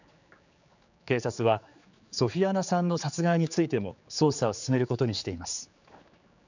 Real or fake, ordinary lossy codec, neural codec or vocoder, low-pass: fake; none; codec, 16 kHz, 4 kbps, X-Codec, HuBERT features, trained on general audio; 7.2 kHz